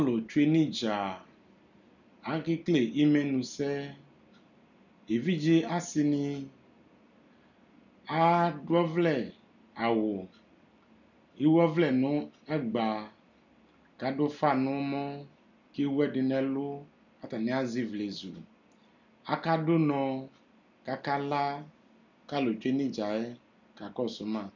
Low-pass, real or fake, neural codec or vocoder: 7.2 kHz; real; none